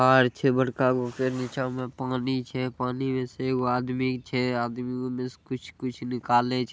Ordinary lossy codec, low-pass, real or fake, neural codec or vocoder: none; none; real; none